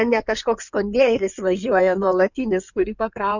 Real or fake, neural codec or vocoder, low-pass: fake; codec, 16 kHz in and 24 kHz out, 2.2 kbps, FireRedTTS-2 codec; 7.2 kHz